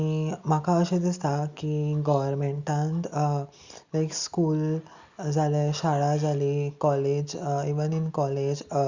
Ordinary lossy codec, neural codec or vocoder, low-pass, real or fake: Opus, 64 kbps; none; 7.2 kHz; real